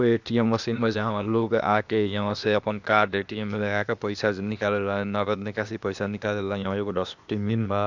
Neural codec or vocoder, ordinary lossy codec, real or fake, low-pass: codec, 16 kHz, 0.8 kbps, ZipCodec; Opus, 64 kbps; fake; 7.2 kHz